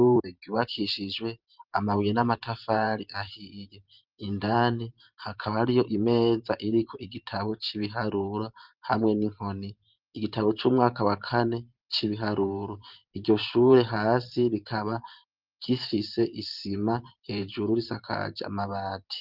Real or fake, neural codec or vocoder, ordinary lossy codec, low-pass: fake; vocoder, 44.1 kHz, 128 mel bands every 512 samples, BigVGAN v2; Opus, 32 kbps; 5.4 kHz